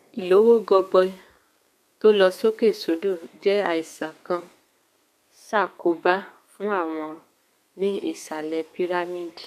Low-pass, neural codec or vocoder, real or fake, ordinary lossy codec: 14.4 kHz; codec, 32 kHz, 1.9 kbps, SNAC; fake; none